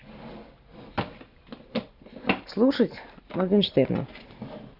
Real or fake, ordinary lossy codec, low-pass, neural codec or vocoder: real; Opus, 64 kbps; 5.4 kHz; none